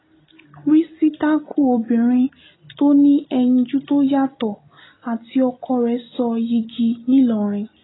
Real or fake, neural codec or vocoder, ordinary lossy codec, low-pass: real; none; AAC, 16 kbps; 7.2 kHz